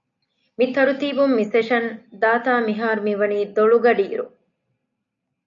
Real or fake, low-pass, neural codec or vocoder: real; 7.2 kHz; none